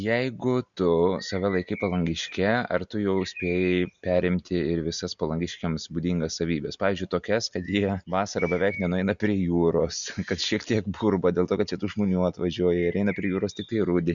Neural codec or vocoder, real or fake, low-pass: none; real; 7.2 kHz